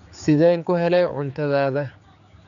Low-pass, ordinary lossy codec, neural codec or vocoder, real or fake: 7.2 kHz; none; codec, 16 kHz, 4 kbps, X-Codec, HuBERT features, trained on general audio; fake